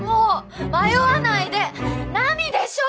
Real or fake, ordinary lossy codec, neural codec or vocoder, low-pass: real; none; none; none